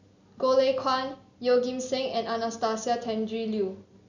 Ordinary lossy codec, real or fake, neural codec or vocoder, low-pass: none; real; none; 7.2 kHz